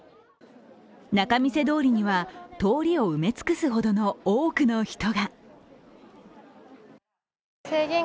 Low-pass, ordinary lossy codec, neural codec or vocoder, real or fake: none; none; none; real